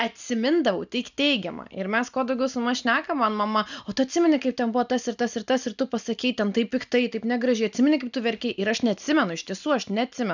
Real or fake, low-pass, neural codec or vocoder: real; 7.2 kHz; none